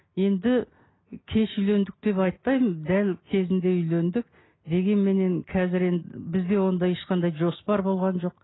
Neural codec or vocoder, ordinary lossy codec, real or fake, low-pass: none; AAC, 16 kbps; real; 7.2 kHz